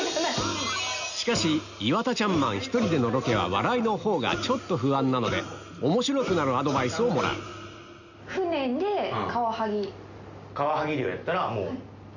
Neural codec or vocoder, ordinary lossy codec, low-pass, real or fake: none; none; 7.2 kHz; real